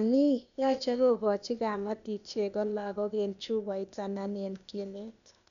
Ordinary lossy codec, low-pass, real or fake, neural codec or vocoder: none; 7.2 kHz; fake; codec, 16 kHz, 0.8 kbps, ZipCodec